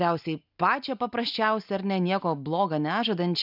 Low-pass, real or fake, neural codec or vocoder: 5.4 kHz; real; none